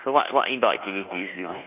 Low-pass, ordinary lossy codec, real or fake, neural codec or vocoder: 3.6 kHz; none; fake; codec, 24 kHz, 1.2 kbps, DualCodec